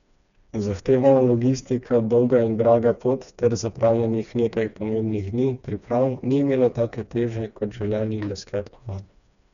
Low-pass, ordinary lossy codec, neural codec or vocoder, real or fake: 7.2 kHz; none; codec, 16 kHz, 2 kbps, FreqCodec, smaller model; fake